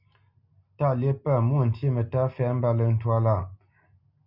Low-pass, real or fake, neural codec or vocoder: 5.4 kHz; real; none